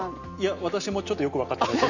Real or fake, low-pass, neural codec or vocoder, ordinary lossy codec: real; 7.2 kHz; none; none